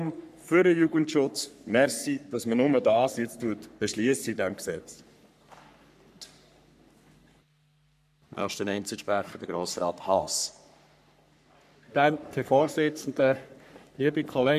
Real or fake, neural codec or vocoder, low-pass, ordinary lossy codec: fake; codec, 44.1 kHz, 3.4 kbps, Pupu-Codec; 14.4 kHz; none